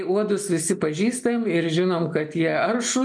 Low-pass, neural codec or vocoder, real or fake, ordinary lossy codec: 9.9 kHz; none; real; MP3, 64 kbps